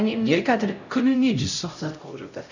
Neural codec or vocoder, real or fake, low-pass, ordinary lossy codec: codec, 16 kHz, 0.5 kbps, X-Codec, HuBERT features, trained on LibriSpeech; fake; 7.2 kHz; none